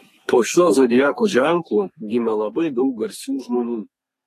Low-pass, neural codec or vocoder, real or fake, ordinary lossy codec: 14.4 kHz; codec, 32 kHz, 1.9 kbps, SNAC; fake; AAC, 48 kbps